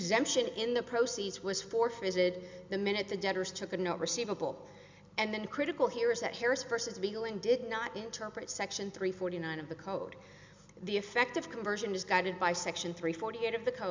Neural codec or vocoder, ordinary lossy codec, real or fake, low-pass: none; MP3, 64 kbps; real; 7.2 kHz